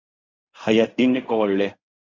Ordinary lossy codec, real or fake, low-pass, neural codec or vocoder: MP3, 48 kbps; fake; 7.2 kHz; codec, 16 kHz in and 24 kHz out, 0.4 kbps, LongCat-Audio-Codec, fine tuned four codebook decoder